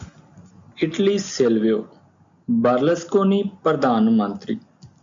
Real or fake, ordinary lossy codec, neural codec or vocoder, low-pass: real; MP3, 64 kbps; none; 7.2 kHz